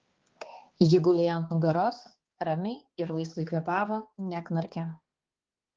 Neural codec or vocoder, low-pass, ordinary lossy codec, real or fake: codec, 16 kHz, 2 kbps, X-Codec, HuBERT features, trained on balanced general audio; 7.2 kHz; Opus, 16 kbps; fake